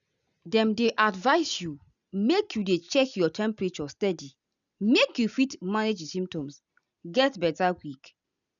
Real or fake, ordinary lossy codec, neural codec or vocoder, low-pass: real; none; none; 7.2 kHz